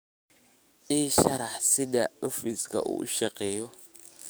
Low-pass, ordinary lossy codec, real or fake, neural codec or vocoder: none; none; fake; codec, 44.1 kHz, 7.8 kbps, DAC